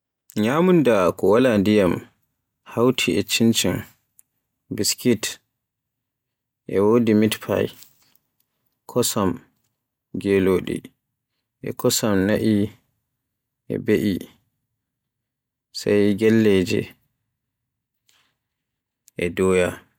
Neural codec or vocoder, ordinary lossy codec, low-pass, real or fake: none; none; 19.8 kHz; real